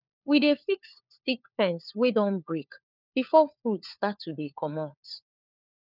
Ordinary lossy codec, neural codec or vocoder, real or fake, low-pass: none; codec, 16 kHz, 4 kbps, FunCodec, trained on LibriTTS, 50 frames a second; fake; 5.4 kHz